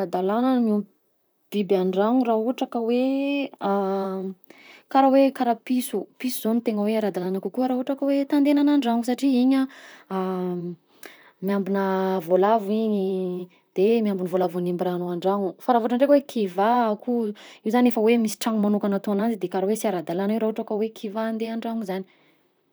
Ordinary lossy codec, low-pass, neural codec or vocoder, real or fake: none; none; vocoder, 44.1 kHz, 128 mel bands, Pupu-Vocoder; fake